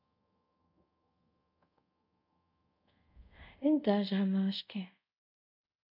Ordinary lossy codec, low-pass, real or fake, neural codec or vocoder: none; 5.4 kHz; fake; codec, 24 kHz, 0.5 kbps, DualCodec